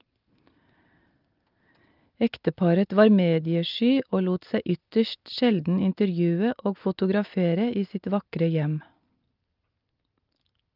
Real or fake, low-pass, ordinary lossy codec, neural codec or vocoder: real; 5.4 kHz; Opus, 24 kbps; none